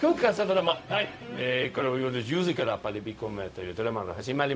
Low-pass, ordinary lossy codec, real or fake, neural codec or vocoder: none; none; fake; codec, 16 kHz, 0.4 kbps, LongCat-Audio-Codec